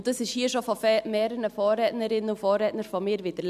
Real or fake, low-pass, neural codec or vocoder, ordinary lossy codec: real; 14.4 kHz; none; none